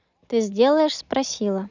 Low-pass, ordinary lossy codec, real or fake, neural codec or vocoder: 7.2 kHz; none; real; none